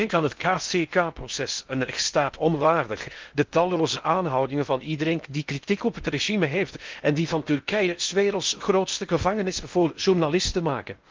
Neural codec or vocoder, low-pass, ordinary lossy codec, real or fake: codec, 16 kHz in and 24 kHz out, 0.6 kbps, FocalCodec, streaming, 4096 codes; 7.2 kHz; Opus, 24 kbps; fake